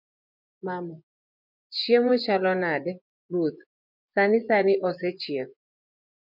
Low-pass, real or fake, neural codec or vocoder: 5.4 kHz; fake; vocoder, 24 kHz, 100 mel bands, Vocos